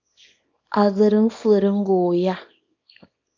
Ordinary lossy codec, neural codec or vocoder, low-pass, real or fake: MP3, 48 kbps; codec, 24 kHz, 0.9 kbps, WavTokenizer, small release; 7.2 kHz; fake